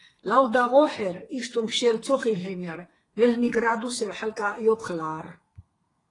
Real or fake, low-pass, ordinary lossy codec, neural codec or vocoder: fake; 10.8 kHz; AAC, 32 kbps; codec, 32 kHz, 1.9 kbps, SNAC